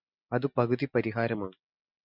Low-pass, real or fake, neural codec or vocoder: 5.4 kHz; real; none